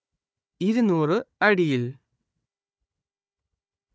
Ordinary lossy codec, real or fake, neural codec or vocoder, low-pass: none; fake; codec, 16 kHz, 4 kbps, FunCodec, trained on Chinese and English, 50 frames a second; none